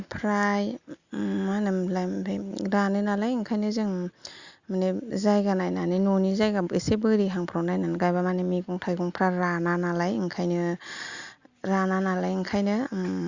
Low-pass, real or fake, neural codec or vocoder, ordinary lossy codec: 7.2 kHz; real; none; Opus, 64 kbps